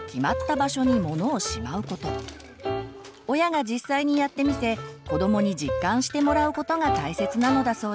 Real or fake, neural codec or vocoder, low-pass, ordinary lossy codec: real; none; none; none